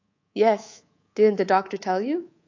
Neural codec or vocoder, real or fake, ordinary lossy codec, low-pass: codec, 24 kHz, 3.1 kbps, DualCodec; fake; AAC, 48 kbps; 7.2 kHz